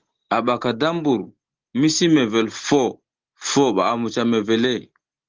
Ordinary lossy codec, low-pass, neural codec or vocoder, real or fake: Opus, 16 kbps; 7.2 kHz; none; real